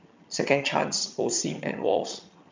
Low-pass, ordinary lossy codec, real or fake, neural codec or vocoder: 7.2 kHz; MP3, 64 kbps; fake; vocoder, 22.05 kHz, 80 mel bands, HiFi-GAN